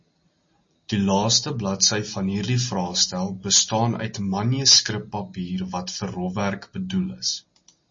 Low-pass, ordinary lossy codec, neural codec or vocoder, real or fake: 7.2 kHz; MP3, 32 kbps; none; real